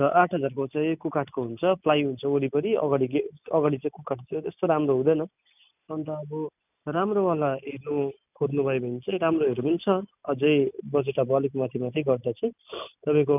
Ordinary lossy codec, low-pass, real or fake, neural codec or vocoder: none; 3.6 kHz; real; none